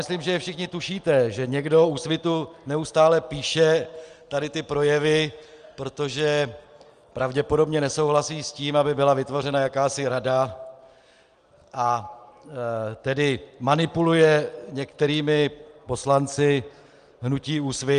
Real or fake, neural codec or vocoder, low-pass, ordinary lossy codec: real; none; 9.9 kHz; Opus, 32 kbps